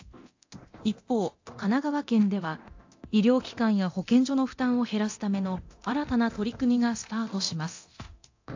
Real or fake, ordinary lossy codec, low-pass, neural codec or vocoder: fake; AAC, 48 kbps; 7.2 kHz; codec, 24 kHz, 0.9 kbps, DualCodec